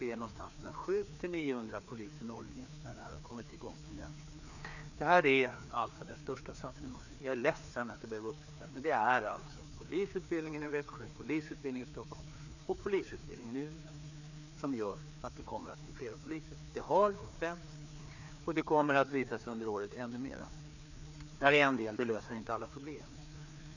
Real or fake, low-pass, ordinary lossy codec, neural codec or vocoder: fake; 7.2 kHz; none; codec, 16 kHz, 2 kbps, FreqCodec, larger model